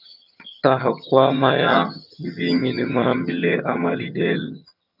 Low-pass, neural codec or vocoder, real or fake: 5.4 kHz; vocoder, 22.05 kHz, 80 mel bands, HiFi-GAN; fake